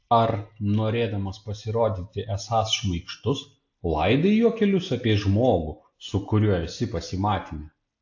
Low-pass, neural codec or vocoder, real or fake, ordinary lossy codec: 7.2 kHz; none; real; AAC, 48 kbps